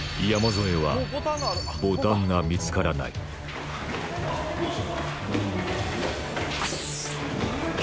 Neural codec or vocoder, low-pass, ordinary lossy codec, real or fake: none; none; none; real